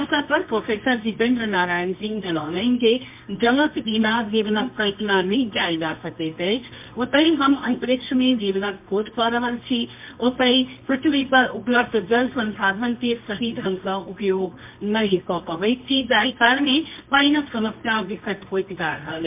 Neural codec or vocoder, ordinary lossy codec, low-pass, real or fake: codec, 24 kHz, 0.9 kbps, WavTokenizer, medium music audio release; MP3, 32 kbps; 3.6 kHz; fake